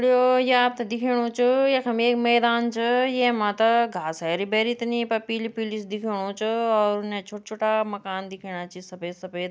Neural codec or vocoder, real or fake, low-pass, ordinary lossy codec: none; real; none; none